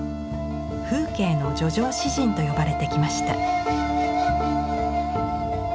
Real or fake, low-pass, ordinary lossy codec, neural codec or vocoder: real; none; none; none